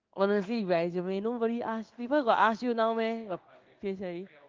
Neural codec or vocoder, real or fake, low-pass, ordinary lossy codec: codec, 16 kHz in and 24 kHz out, 1 kbps, XY-Tokenizer; fake; 7.2 kHz; Opus, 32 kbps